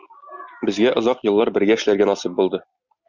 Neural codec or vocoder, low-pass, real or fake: none; 7.2 kHz; real